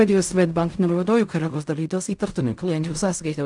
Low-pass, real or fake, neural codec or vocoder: 10.8 kHz; fake; codec, 16 kHz in and 24 kHz out, 0.4 kbps, LongCat-Audio-Codec, fine tuned four codebook decoder